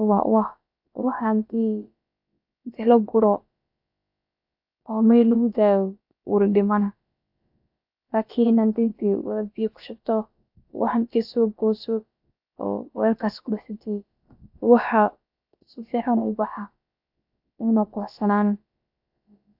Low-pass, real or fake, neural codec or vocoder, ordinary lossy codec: 5.4 kHz; fake; codec, 16 kHz, about 1 kbps, DyCAST, with the encoder's durations; AAC, 48 kbps